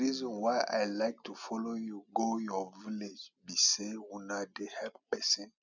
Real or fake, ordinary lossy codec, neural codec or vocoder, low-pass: real; none; none; 7.2 kHz